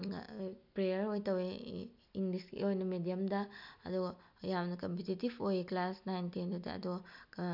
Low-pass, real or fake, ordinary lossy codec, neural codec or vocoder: 5.4 kHz; real; none; none